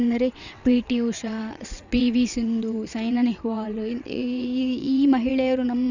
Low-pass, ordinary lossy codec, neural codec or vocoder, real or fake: 7.2 kHz; none; vocoder, 22.05 kHz, 80 mel bands, WaveNeXt; fake